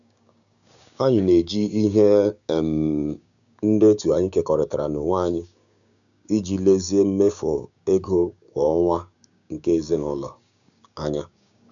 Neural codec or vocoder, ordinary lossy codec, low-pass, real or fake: codec, 16 kHz, 6 kbps, DAC; none; 7.2 kHz; fake